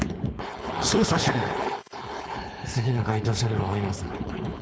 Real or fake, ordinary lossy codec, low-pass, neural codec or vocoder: fake; none; none; codec, 16 kHz, 4.8 kbps, FACodec